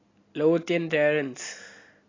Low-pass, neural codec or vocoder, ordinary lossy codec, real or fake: 7.2 kHz; none; AAC, 32 kbps; real